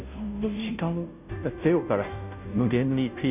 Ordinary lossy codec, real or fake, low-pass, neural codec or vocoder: none; fake; 3.6 kHz; codec, 16 kHz, 0.5 kbps, FunCodec, trained on Chinese and English, 25 frames a second